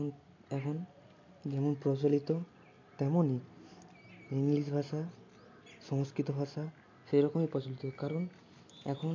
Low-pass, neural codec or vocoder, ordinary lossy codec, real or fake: 7.2 kHz; none; MP3, 48 kbps; real